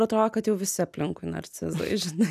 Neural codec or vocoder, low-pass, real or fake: none; 14.4 kHz; real